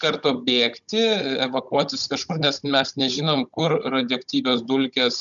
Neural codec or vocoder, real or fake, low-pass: codec, 16 kHz, 16 kbps, FunCodec, trained on Chinese and English, 50 frames a second; fake; 7.2 kHz